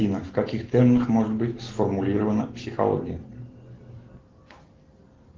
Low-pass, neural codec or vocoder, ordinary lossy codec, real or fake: 7.2 kHz; none; Opus, 16 kbps; real